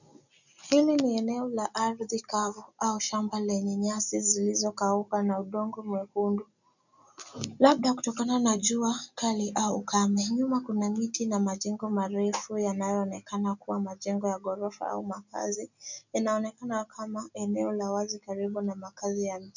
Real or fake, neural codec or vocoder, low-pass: real; none; 7.2 kHz